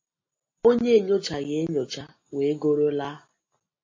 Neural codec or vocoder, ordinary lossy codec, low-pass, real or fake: none; MP3, 32 kbps; 7.2 kHz; real